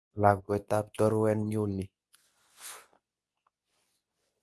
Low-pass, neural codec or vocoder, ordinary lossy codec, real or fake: none; codec, 24 kHz, 0.9 kbps, WavTokenizer, medium speech release version 2; none; fake